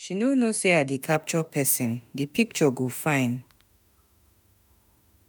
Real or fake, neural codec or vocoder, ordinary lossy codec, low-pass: fake; autoencoder, 48 kHz, 32 numbers a frame, DAC-VAE, trained on Japanese speech; none; none